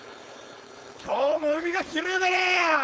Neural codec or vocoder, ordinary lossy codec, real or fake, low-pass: codec, 16 kHz, 4.8 kbps, FACodec; none; fake; none